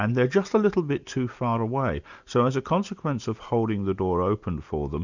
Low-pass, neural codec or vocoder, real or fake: 7.2 kHz; none; real